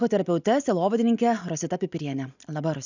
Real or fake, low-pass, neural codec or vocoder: real; 7.2 kHz; none